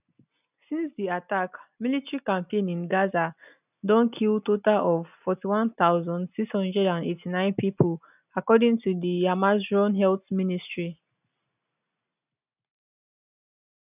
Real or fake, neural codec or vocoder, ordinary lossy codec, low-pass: real; none; none; 3.6 kHz